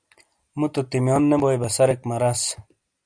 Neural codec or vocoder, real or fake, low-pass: none; real; 9.9 kHz